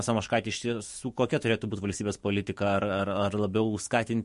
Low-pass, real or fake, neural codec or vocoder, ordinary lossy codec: 14.4 kHz; fake; autoencoder, 48 kHz, 128 numbers a frame, DAC-VAE, trained on Japanese speech; MP3, 48 kbps